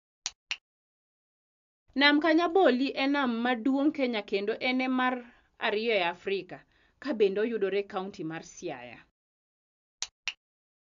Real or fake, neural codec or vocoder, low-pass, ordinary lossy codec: real; none; 7.2 kHz; AAC, 64 kbps